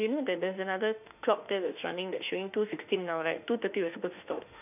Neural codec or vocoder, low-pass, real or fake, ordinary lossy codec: autoencoder, 48 kHz, 32 numbers a frame, DAC-VAE, trained on Japanese speech; 3.6 kHz; fake; none